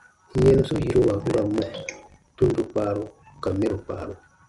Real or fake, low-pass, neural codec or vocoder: real; 10.8 kHz; none